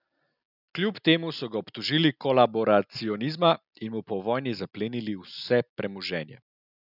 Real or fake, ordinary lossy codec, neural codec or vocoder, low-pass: real; none; none; 5.4 kHz